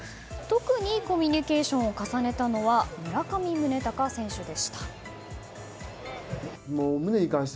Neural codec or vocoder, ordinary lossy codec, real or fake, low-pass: none; none; real; none